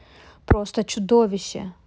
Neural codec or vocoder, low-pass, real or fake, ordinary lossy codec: none; none; real; none